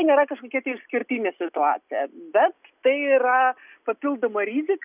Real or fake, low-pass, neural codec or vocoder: real; 3.6 kHz; none